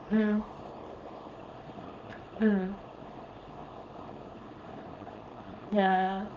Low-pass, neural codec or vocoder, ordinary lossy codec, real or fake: 7.2 kHz; codec, 24 kHz, 0.9 kbps, WavTokenizer, small release; Opus, 32 kbps; fake